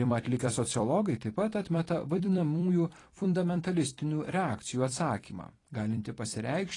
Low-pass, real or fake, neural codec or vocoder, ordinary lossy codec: 10.8 kHz; fake; vocoder, 44.1 kHz, 128 mel bands every 256 samples, BigVGAN v2; AAC, 32 kbps